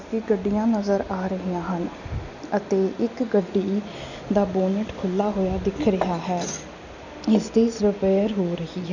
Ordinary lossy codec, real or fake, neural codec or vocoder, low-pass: none; real; none; 7.2 kHz